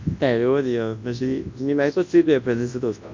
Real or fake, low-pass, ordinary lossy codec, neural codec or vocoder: fake; 7.2 kHz; MP3, 64 kbps; codec, 24 kHz, 0.9 kbps, WavTokenizer, large speech release